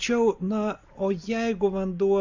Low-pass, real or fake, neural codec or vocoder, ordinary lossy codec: 7.2 kHz; real; none; Opus, 64 kbps